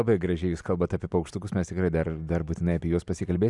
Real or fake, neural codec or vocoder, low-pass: real; none; 10.8 kHz